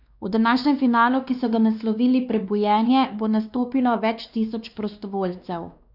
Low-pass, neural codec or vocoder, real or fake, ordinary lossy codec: 5.4 kHz; codec, 16 kHz, 2 kbps, X-Codec, WavLM features, trained on Multilingual LibriSpeech; fake; none